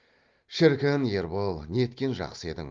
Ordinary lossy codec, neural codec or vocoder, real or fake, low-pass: Opus, 32 kbps; none; real; 7.2 kHz